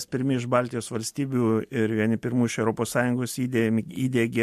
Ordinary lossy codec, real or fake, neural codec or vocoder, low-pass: MP3, 64 kbps; real; none; 14.4 kHz